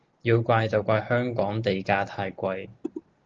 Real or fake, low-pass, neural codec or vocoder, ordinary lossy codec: real; 7.2 kHz; none; Opus, 16 kbps